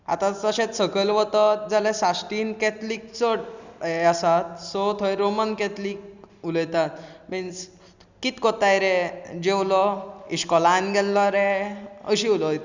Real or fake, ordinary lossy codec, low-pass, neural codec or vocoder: real; Opus, 64 kbps; 7.2 kHz; none